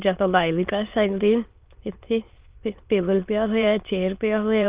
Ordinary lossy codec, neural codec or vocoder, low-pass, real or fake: Opus, 32 kbps; autoencoder, 22.05 kHz, a latent of 192 numbers a frame, VITS, trained on many speakers; 3.6 kHz; fake